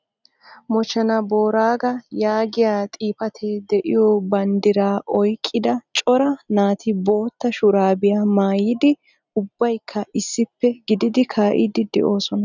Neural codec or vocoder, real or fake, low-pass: none; real; 7.2 kHz